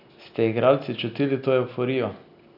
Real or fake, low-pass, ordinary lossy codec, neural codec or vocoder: real; 5.4 kHz; none; none